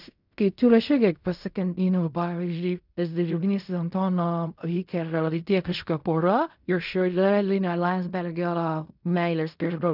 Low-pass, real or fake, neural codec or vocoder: 5.4 kHz; fake; codec, 16 kHz in and 24 kHz out, 0.4 kbps, LongCat-Audio-Codec, fine tuned four codebook decoder